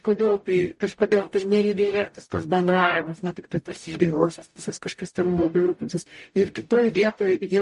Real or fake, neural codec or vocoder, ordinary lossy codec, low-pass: fake; codec, 44.1 kHz, 0.9 kbps, DAC; MP3, 48 kbps; 14.4 kHz